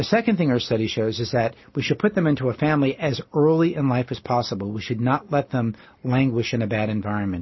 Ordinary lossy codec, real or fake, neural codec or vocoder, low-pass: MP3, 24 kbps; real; none; 7.2 kHz